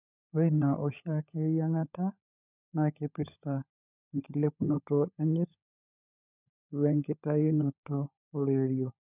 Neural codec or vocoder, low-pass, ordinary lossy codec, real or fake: codec, 16 kHz, 16 kbps, FunCodec, trained on LibriTTS, 50 frames a second; 3.6 kHz; none; fake